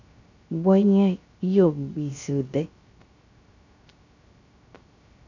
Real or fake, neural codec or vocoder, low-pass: fake; codec, 16 kHz, 0.3 kbps, FocalCodec; 7.2 kHz